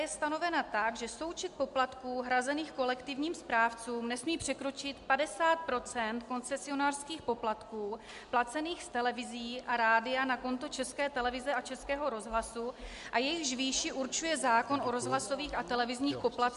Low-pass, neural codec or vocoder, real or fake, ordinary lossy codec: 10.8 kHz; none; real; MP3, 64 kbps